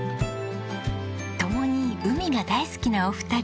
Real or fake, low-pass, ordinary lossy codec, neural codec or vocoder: real; none; none; none